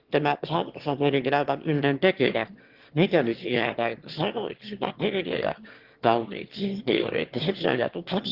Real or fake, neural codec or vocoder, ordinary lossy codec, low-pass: fake; autoencoder, 22.05 kHz, a latent of 192 numbers a frame, VITS, trained on one speaker; Opus, 16 kbps; 5.4 kHz